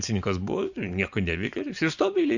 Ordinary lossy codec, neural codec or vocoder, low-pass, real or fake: Opus, 64 kbps; vocoder, 24 kHz, 100 mel bands, Vocos; 7.2 kHz; fake